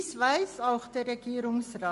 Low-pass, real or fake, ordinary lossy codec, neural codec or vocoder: 14.4 kHz; real; MP3, 48 kbps; none